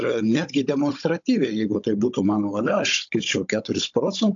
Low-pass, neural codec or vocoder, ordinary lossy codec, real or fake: 7.2 kHz; codec, 16 kHz, 16 kbps, FunCodec, trained on Chinese and English, 50 frames a second; AAC, 64 kbps; fake